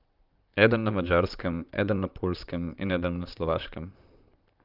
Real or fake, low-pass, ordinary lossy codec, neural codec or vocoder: fake; 5.4 kHz; Opus, 24 kbps; vocoder, 44.1 kHz, 128 mel bands, Pupu-Vocoder